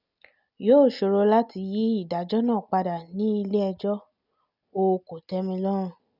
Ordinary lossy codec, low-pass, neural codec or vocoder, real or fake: none; 5.4 kHz; none; real